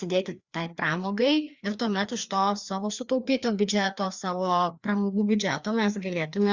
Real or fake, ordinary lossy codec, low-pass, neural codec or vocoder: fake; Opus, 64 kbps; 7.2 kHz; codec, 16 kHz, 2 kbps, FreqCodec, larger model